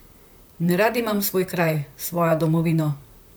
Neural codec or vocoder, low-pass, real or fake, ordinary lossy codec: vocoder, 44.1 kHz, 128 mel bands, Pupu-Vocoder; none; fake; none